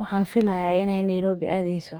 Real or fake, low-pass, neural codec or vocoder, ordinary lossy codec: fake; none; codec, 44.1 kHz, 2.6 kbps, DAC; none